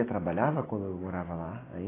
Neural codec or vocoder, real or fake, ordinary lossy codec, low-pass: none; real; AAC, 16 kbps; 3.6 kHz